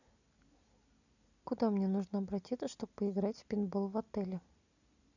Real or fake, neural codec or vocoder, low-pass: real; none; 7.2 kHz